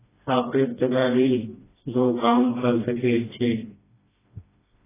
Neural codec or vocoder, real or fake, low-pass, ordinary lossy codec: codec, 16 kHz, 1 kbps, FreqCodec, smaller model; fake; 3.6 kHz; AAC, 16 kbps